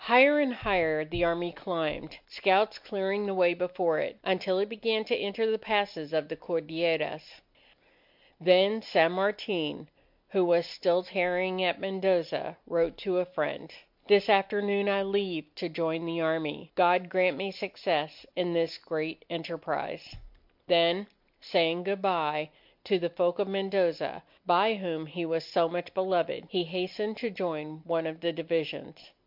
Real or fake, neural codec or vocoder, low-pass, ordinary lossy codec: real; none; 5.4 kHz; MP3, 48 kbps